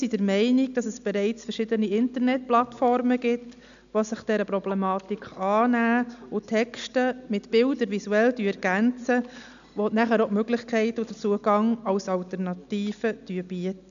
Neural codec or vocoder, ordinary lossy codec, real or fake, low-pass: none; none; real; 7.2 kHz